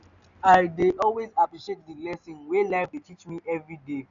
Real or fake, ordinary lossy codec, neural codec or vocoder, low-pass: real; none; none; 7.2 kHz